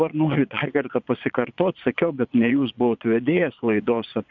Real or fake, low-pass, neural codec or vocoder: fake; 7.2 kHz; vocoder, 22.05 kHz, 80 mel bands, Vocos